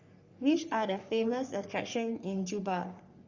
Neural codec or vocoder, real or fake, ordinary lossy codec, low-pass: codec, 44.1 kHz, 3.4 kbps, Pupu-Codec; fake; Opus, 64 kbps; 7.2 kHz